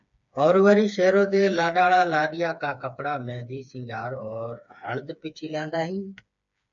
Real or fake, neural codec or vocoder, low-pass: fake; codec, 16 kHz, 4 kbps, FreqCodec, smaller model; 7.2 kHz